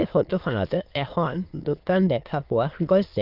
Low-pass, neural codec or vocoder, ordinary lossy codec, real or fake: 5.4 kHz; autoencoder, 22.05 kHz, a latent of 192 numbers a frame, VITS, trained on many speakers; Opus, 32 kbps; fake